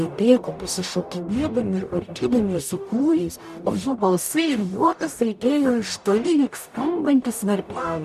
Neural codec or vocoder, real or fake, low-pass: codec, 44.1 kHz, 0.9 kbps, DAC; fake; 14.4 kHz